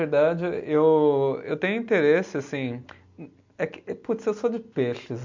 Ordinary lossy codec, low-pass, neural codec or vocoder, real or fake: MP3, 64 kbps; 7.2 kHz; none; real